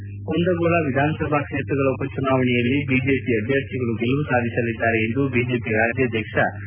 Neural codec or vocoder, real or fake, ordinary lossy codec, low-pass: none; real; none; 3.6 kHz